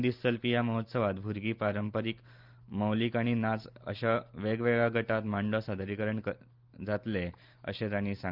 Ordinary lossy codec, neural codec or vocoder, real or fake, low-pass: Opus, 32 kbps; none; real; 5.4 kHz